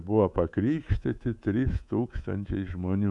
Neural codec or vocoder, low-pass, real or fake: codec, 24 kHz, 3.1 kbps, DualCodec; 10.8 kHz; fake